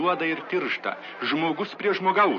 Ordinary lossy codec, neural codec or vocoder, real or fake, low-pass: MP3, 32 kbps; none; real; 7.2 kHz